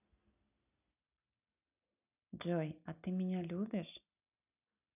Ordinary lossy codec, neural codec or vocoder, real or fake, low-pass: none; none; real; 3.6 kHz